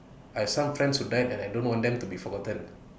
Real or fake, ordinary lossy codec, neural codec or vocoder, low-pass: real; none; none; none